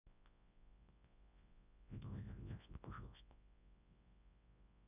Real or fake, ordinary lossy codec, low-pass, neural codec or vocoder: fake; none; 3.6 kHz; codec, 24 kHz, 0.9 kbps, WavTokenizer, large speech release